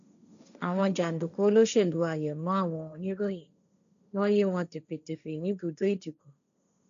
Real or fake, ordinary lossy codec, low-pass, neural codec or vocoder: fake; MP3, 96 kbps; 7.2 kHz; codec, 16 kHz, 1.1 kbps, Voila-Tokenizer